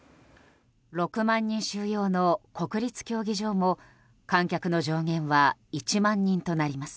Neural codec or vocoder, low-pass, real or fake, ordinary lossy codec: none; none; real; none